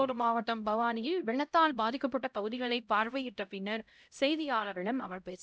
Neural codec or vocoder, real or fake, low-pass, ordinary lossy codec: codec, 16 kHz, 0.5 kbps, X-Codec, HuBERT features, trained on LibriSpeech; fake; none; none